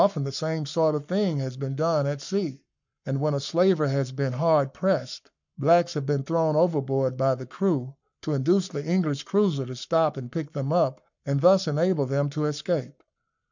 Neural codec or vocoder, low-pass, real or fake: codec, 44.1 kHz, 7.8 kbps, Pupu-Codec; 7.2 kHz; fake